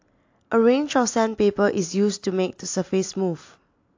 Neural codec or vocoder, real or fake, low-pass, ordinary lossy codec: none; real; 7.2 kHz; AAC, 48 kbps